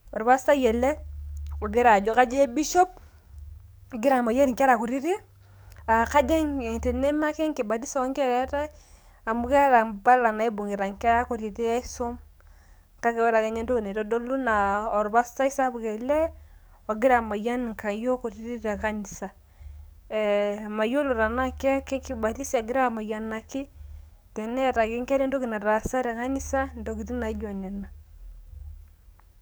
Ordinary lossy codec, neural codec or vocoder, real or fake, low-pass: none; codec, 44.1 kHz, 7.8 kbps, DAC; fake; none